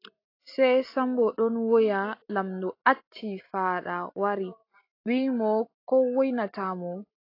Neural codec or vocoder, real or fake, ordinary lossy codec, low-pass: none; real; AAC, 32 kbps; 5.4 kHz